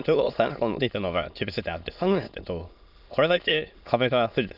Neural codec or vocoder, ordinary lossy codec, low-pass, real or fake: autoencoder, 22.05 kHz, a latent of 192 numbers a frame, VITS, trained on many speakers; none; 5.4 kHz; fake